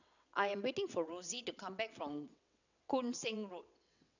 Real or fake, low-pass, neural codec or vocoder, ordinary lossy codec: fake; 7.2 kHz; vocoder, 22.05 kHz, 80 mel bands, WaveNeXt; none